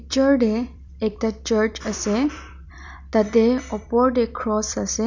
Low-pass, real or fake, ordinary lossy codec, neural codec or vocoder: 7.2 kHz; fake; none; vocoder, 44.1 kHz, 128 mel bands every 256 samples, BigVGAN v2